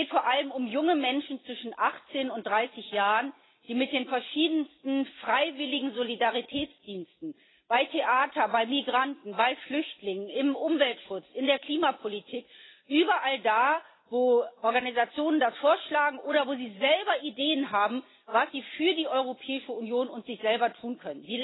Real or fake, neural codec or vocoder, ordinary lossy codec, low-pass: real; none; AAC, 16 kbps; 7.2 kHz